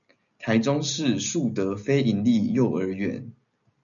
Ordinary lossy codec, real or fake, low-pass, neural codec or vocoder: MP3, 96 kbps; real; 7.2 kHz; none